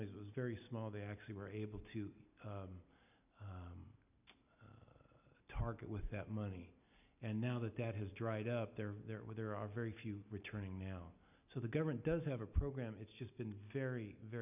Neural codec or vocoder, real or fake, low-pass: none; real; 3.6 kHz